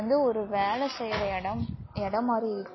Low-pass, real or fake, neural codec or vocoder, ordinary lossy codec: 7.2 kHz; real; none; MP3, 24 kbps